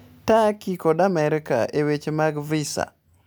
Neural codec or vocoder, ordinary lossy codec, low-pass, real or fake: none; none; none; real